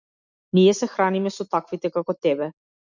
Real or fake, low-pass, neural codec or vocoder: real; 7.2 kHz; none